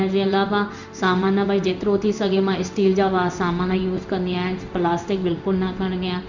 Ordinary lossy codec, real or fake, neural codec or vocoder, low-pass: Opus, 64 kbps; fake; codec, 16 kHz in and 24 kHz out, 1 kbps, XY-Tokenizer; 7.2 kHz